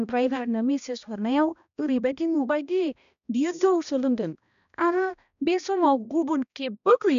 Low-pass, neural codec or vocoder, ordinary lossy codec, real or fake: 7.2 kHz; codec, 16 kHz, 1 kbps, X-Codec, HuBERT features, trained on balanced general audio; none; fake